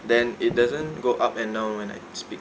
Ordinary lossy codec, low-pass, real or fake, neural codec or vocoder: none; none; real; none